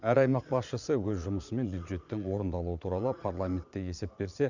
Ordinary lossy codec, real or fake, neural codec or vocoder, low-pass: none; fake; vocoder, 22.05 kHz, 80 mel bands, Vocos; 7.2 kHz